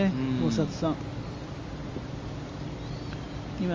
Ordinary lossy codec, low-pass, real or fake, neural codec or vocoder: Opus, 32 kbps; 7.2 kHz; real; none